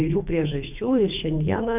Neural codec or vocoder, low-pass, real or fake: codec, 24 kHz, 3 kbps, HILCodec; 3.6 kHz; fake